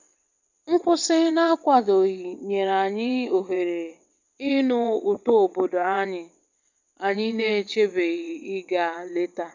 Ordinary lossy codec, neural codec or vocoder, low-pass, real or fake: none; vocoder, 22.05 kHz, 80 mel bands, WaveNeXt; 7.2 kHz; fake